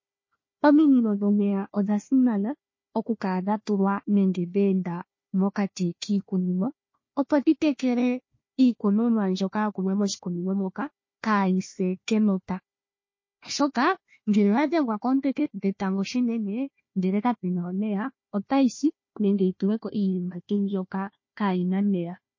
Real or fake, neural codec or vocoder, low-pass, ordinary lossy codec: fake; codec, 16 kHz, 1 kbps, FunCodec, trained on Chinese and English, 50 frames a second; 7.2 kHz; MP3, 32 kbps